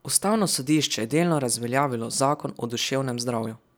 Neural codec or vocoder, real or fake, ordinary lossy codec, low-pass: none; real; none; none